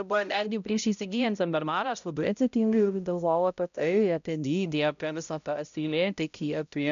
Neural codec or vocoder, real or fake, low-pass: codec, 16 kHz, 0.5 kbps, X-Codec, HuBERT features, trained on balanced general audio; fake; 7.2 kHz